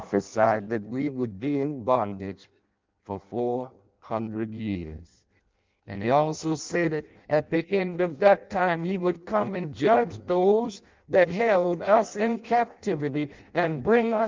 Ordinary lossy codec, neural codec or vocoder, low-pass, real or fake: Opus, 32 kbps; codec, 16 kHz in and 24 kHz out, 0.6 kbps, FireRedTTS-2 codec; 7.2 kHz; fake